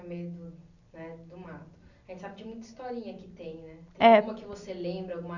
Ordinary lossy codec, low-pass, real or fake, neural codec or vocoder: none; 7.2 kHz; real; none